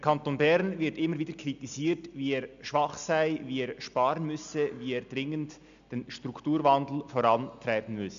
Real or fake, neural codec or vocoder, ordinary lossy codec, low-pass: real; none; AAC, 96 kbps; 7.2 kHz